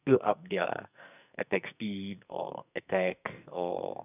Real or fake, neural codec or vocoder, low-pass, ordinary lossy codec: fake; codec, 44.1 kHz, 2.6 kbps, SNAC; 3.6 kHz; none